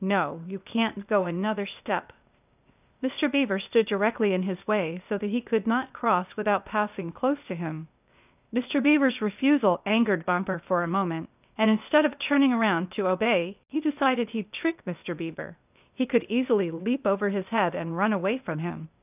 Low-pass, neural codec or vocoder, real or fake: 3.6 kHz; codec, 16 kHz, 0.8 kbps, ZipCodec; fake